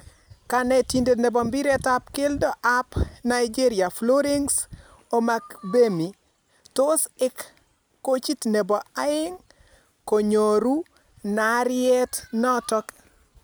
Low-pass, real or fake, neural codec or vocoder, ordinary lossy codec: none; real; none; none